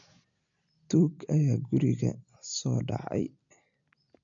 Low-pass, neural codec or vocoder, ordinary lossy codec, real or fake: 7.2 kHz; none; AAC, 64 kbps; real